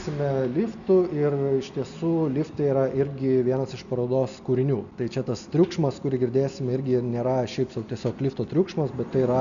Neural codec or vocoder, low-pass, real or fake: none; 7.2 kHz; real